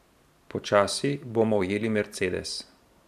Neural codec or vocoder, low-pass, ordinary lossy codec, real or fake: vocoder, 44.1 kHz, 128 mel bands every 512 samples, BigVGAN v2; 14.4 kHz; none; fake